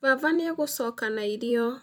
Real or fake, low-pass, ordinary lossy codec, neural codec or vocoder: fake; none; none; vocoder, 44.1 kHz, 128 mel bands every 256 samples, BigVGAN v2